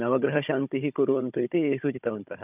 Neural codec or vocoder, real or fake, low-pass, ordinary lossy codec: codec, 16 kHz, 16 kbps, FunCodec, trained on Chinese and English, 50 frames a second; fake; 3.6 kHz; none